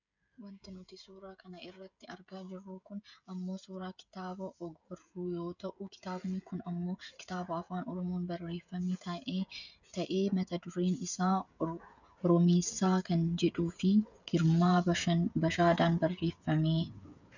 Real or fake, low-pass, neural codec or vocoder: fake; 7.2 kHz; codec, 16 kHz, 16 kbps, FreqCodec, smaller model